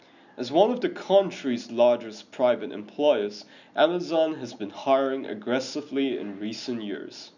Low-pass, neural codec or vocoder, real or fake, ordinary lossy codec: 7.2 kHz; none; real; none